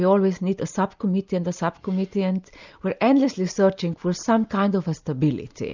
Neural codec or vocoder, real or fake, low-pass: none; real; 7.2 kHz